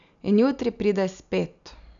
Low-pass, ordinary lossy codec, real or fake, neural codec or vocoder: 7.2 kHz; none; real; none